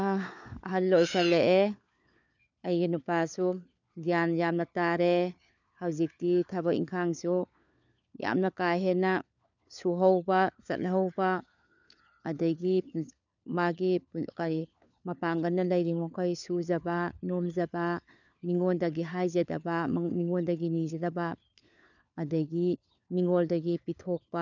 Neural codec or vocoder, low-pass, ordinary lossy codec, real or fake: codec, 16 kHz, 4 kbps, FunCodec, trained on LibriTTS, 50 frames a second; 7.2 kHz; none; fake